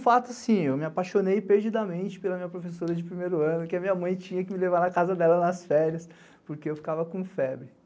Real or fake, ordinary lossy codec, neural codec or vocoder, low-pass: real; none; none; none